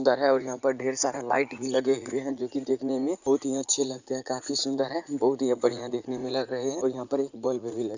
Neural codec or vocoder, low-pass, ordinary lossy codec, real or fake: vocoder, 44.1 kHz, 80 mel bands, Vocos; 7.2 kHz; Opus, 64 kbps; fake